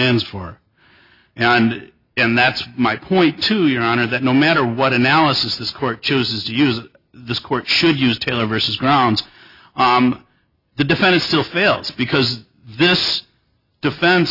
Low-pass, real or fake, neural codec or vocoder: 5.4 kHz; real; none